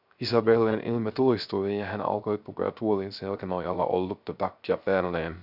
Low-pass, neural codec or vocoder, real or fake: 5.4 kHz; codec, 16 kHz, 0.3 kbps, FocalCodec; fake